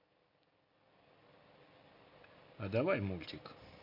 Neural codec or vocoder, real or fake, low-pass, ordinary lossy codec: none; real; 5.4 kHz; MP3, 32 kbps